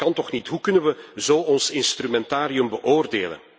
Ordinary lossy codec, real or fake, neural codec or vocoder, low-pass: none; real; none; none